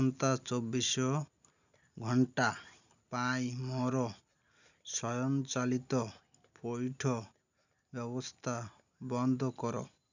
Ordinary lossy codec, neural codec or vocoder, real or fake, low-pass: none; none; real; 7.2 kHz